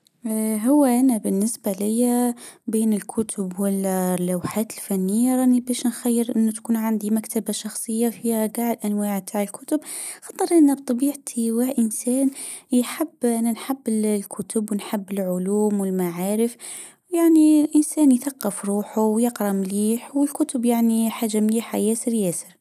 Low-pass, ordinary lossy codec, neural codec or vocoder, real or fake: 14.4 kHz; none; none; real